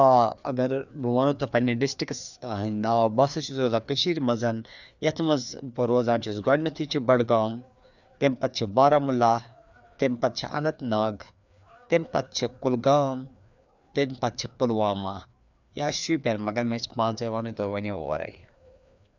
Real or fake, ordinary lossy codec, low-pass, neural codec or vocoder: fake; none; 7.2 kHz; codec, 16 kHz, 2 kbps, FreqCodec, larger model